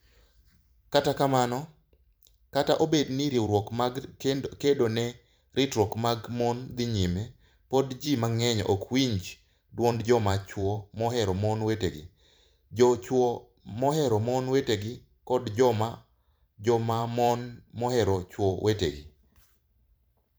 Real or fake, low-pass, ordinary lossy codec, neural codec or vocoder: fake; none; none; vocoder, 44.1 kHz, 128 mel bands every 512 samples, BigVGAN v2